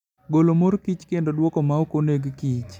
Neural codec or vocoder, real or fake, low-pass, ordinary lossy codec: none; real; 19.8 kHz; none